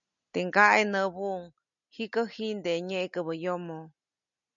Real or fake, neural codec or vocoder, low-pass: real; none; 7.2 kHz